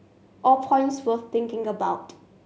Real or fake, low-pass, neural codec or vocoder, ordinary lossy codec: real; none; none; none